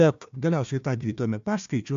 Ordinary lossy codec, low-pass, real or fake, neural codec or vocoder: MP3, 64 kbps; 7.2 kHz; fake; codec, 16 kHz, 1 kbps, FunCodec, trained on Chinese and English, 50 frames a second